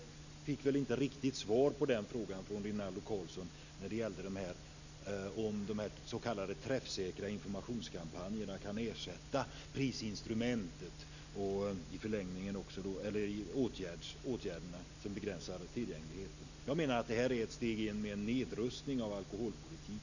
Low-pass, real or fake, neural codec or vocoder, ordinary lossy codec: 7.2 kHz; real; none; none